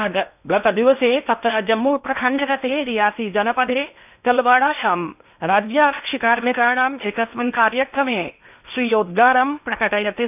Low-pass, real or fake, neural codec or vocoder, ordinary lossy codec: 3.6 kHz; fake; codec, 16 kHz in and 24 kHz out, 0.8 kbps, FocalCodec, streaming, 65536 codes; none